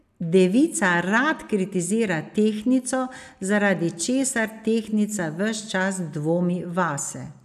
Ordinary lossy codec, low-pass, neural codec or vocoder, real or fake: none; 14.4 kHz; none; real